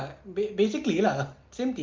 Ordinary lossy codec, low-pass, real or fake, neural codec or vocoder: Opus, 24 kbps; 7.2 kHz; real; none